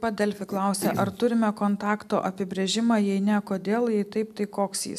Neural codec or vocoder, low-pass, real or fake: none; 14.4 kHz; real